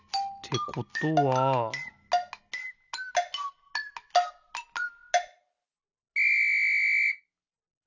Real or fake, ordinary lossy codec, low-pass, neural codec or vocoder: real; none; 7.2 kHz; none